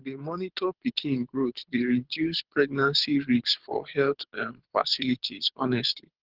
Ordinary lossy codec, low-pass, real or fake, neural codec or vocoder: Opus, 16 kbps; 5.4 kHz; fake; codec, 24 kHz, 6 kbps, HILCodec